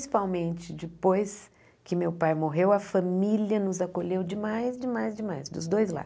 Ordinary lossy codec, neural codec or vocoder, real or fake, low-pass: none; none; real; none